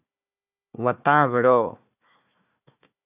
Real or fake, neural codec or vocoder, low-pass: fake; codec, 16 kHz, 1 kbps, FunCodec, trained on Chinese and English, 50 frames a second; 3.6 kHz